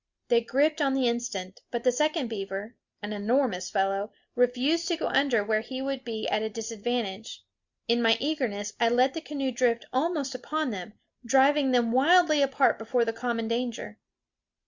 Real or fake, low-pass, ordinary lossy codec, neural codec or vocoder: real; 7.2 kHz; Opus, 64 kbps; none